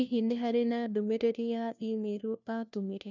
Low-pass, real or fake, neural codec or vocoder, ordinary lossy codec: 7.2 kHz; fake; codec, 16 kHz, 1 kbps, FunCodec, trained on LibriTTS, 50 frames a second; none